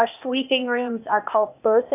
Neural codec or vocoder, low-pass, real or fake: codec, 16 kHz, 0.8 kbps, ZipCodec; 3.6 kHz; fake